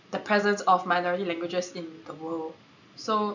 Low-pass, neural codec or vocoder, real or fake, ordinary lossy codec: 7.2 kHz; none; real; none